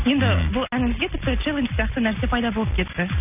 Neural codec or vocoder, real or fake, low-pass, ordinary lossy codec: none; real; 3.6 kHz; none